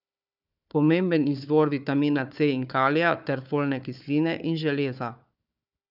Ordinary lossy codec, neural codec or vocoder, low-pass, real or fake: none; codec, 16 kHz, 4 kbps, FunCodec, trained on Chinese and English, 50 frames a second; 5.4 kHz; fake